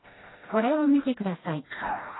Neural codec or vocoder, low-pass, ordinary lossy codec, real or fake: codec, 16 kHz, 1 kbps, FreqCodec, smaller model; 7.2 kHz; AAC, 16 kbps; fake